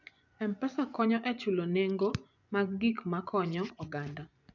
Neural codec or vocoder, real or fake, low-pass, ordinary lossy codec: vocoder, 24 kHz, 100 mel bands, Vocos; fake; 7.2 kHz; none